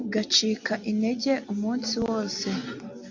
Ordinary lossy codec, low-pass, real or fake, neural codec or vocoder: AAC, 48 kbps; 7.2 kHz; real; none